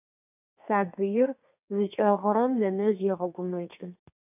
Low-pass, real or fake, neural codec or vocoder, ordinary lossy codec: 3.6 kHz; fake; codec, 32 kHz, 1.9 kbps, SNAC; AAC, 32 kbps